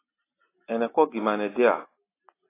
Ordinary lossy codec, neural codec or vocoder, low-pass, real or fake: AAC, 16 kbps; none; 3.6 kHz; real